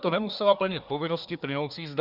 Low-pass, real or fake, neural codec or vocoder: 5.4 kHz; fake; codec, 24 kHz, 1 kbps, SNAC